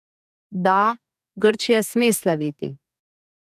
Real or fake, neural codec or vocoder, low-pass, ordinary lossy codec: fake; codec, 44.1 kHz, 2.6 kbps, DAC; 14.4 kHz; none